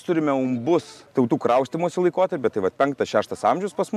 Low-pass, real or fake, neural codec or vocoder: 14.4 kHz; real; none